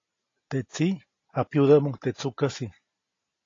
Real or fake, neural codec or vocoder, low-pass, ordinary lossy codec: real; none; 7.2 kHz; AAC, 32 kbps